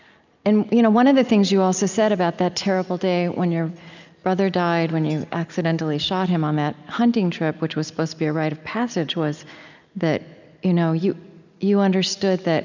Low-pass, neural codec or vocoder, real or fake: 7.2 kHz; none; real